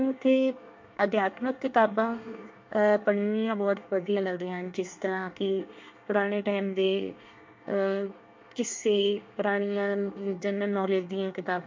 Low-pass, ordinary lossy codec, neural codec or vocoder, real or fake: 7.2 kHz; MP3, 48 kbps; codec, 24 kHz, 1 kbps, SNAC; fake